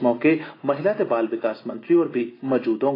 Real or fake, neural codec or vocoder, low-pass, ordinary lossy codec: real; none; 5.4 kHz; AAC, 24 kbps